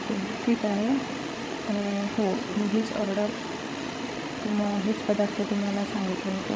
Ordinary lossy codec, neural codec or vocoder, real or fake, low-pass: none; codec, 16 kHz, 16 kbps, FreqCodec, larger model; fake; none